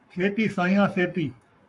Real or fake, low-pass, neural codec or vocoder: fake; 10.8 kHz; codec, 44.1 kHz, 7.8 kbps, Pupu-Codec